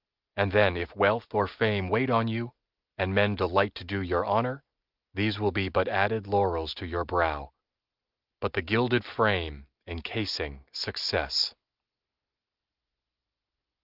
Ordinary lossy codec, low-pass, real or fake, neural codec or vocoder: Opus, 24 kbps; 5.4 kHz; real; none